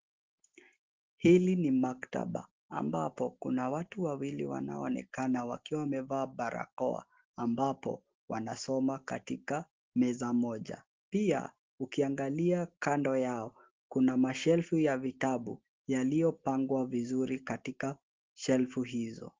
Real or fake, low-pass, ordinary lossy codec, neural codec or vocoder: real; 7.2 kHz; Opus, 16 kbps; none